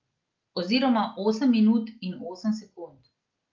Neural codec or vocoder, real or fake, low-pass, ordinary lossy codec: none; real; 7.2 kHz; Opus, 24 kbps